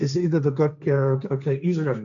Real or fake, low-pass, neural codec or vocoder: fake; 7.2 kHz; codec, 16 kHz, 1.1 kbps, Voila-Tokenizer